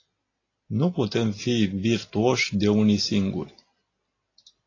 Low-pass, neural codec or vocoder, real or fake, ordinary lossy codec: 7.2 kHz; none; real; AAC, 32 kbps